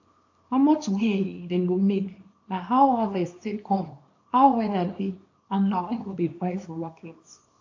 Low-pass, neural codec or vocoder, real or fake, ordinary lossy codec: 7.2 kHz; codec, 24 kHz, 0.9 kbps, WavTokenizer, small release; fake; AAC, 48 kbps